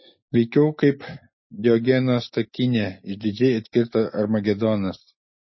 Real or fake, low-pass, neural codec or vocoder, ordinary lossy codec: real; 7.2 kHz; none; MP3, 24 kbps